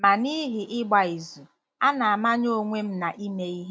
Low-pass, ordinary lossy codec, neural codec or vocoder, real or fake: none; none; none; real